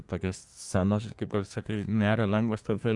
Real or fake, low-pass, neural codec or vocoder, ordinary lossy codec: fake; 10.8 kHz; codec, 24 kHz, 1 kbps, SNAC; AAC, 64 kbps